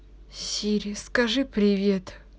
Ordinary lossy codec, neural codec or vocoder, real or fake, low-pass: none; none; real; none